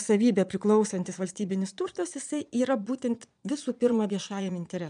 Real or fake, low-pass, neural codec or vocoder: fake; 9.9 kHz; vocoder, 22.05 kHz, 80 mel bands, Vocos